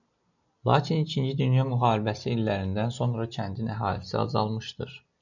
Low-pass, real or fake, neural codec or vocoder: 7.2 kHz; real; none